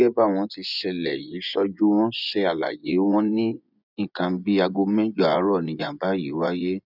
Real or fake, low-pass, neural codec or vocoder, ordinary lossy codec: fake; 5.4 kHz; vocoder, 24 kHz, 100 mel bands, Vocos; none